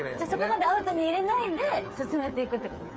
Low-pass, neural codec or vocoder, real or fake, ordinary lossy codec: none; codec, 16 kHz, 8 kbps, FreqCodec, smaller model; fake; none